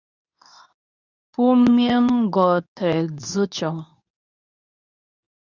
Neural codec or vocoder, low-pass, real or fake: codec, 24 kHz, 0.9 kbps, WavTokenizer, medium speech release version 2; 7.2 kHz; fake